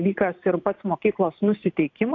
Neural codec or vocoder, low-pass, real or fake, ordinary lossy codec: none; 7.2 kHz; real; AAC, 48 kbps